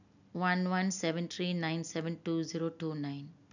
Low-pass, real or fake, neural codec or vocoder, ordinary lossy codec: 7.2 kHz; real; none; none